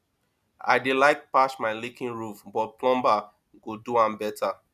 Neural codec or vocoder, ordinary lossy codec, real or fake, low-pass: none; none; real; 14.4 kHz